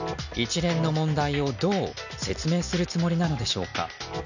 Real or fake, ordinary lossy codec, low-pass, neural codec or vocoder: real; none; 7.2 kHz; none